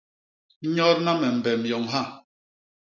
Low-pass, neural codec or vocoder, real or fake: 7.2 kHz; none; real